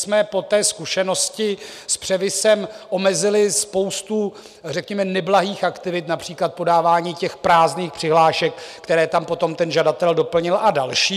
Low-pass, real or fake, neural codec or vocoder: 14.4 kHz; real; none